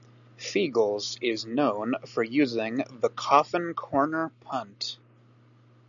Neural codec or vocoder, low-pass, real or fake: none; 7.2 kHz; real